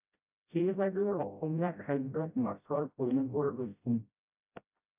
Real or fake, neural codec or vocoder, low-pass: fake; codec, 16 kHz, 0.5 kbps, FreqCodec, smaller model; 3.6 kHz